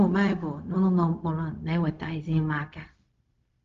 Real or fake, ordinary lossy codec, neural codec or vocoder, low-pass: fake; Opus, 32 kbps; codec, 16 kHz, 0.4 kbps, LongCat-Audio-Codec; 7.2 kHz